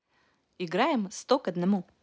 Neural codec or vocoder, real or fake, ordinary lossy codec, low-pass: none; real; none; none